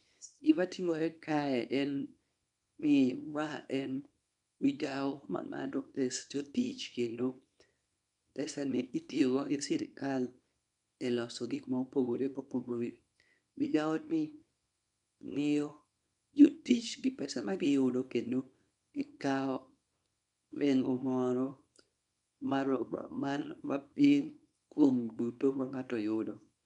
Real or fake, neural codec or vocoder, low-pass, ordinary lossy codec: fake; codec, 24 kHz, 0.9 kbps, WavTokenizer, small release; 10.8 kHz; none